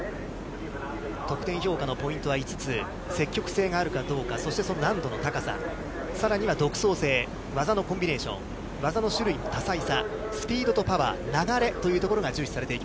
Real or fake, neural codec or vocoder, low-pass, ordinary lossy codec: real; none; none; none